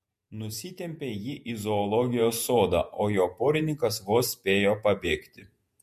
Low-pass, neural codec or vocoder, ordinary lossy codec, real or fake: 14.4 kHz; vocoder, 48 kHz, 128 mel bands, Vocos; MP3, 64 kbps; fake